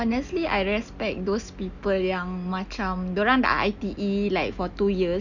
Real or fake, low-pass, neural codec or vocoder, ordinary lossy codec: real; 7.2 kHz; none; none